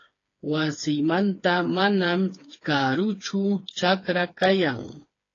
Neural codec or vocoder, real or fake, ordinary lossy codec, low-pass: codec, 16 kHz, 4 kbps, FreqCodec, smaller model; fake; AAC, 32 kbps; 7.2 kHz